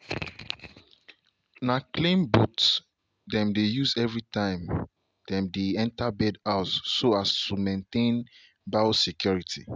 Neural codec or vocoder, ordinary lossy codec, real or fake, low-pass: none; none; real; none